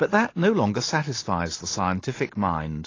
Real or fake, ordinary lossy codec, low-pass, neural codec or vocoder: real; AAC, 32 kbps; 7.2 kHz; none